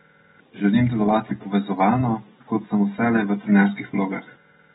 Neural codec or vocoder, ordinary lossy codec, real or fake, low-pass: none; AAC, 16 kbps; real; 7.2 kHz